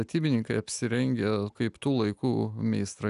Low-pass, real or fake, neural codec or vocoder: 10.8 kHz; real; none